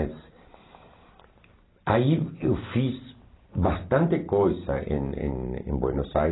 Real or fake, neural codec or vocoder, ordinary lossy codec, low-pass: fake; vocoder, 44.1 kHz, 128 mel bands every 256 samples, BigVGAN v2; AAC, 16 kbps; 7.2 kHz